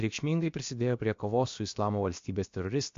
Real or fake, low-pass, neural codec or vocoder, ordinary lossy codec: fake; 7.2 kHz; codec, 16 kHz, about 1 kbps, DyCAST, with the encoder's durations; MP3, 48 kbps